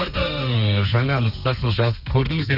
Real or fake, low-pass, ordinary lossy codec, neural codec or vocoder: fake; 5.4 kHz; none; codec, 32 kHz, 1.9 kbps, SNAC